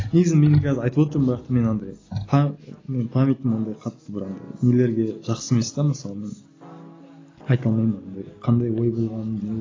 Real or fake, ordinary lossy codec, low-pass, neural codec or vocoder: real; AAC, 32 kbps; 7.2 kHz; none